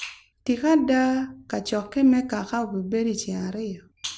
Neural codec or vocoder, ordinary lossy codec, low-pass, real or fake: none; none; none; real